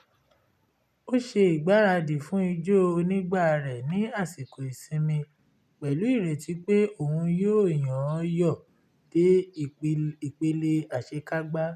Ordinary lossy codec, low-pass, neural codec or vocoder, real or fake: none; 14.4 kHz; none; real